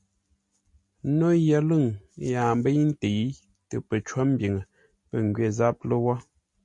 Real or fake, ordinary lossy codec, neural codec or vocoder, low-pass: real; MP3, 48 kbps; none; 10.8 kHz